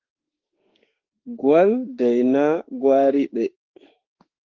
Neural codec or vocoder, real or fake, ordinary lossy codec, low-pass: autoencoder, 48 kHz, 32 numbers a frame, DAC-VAE, trained on Japanese speech; fake; Opus, 16 kbps; 7.2 kHz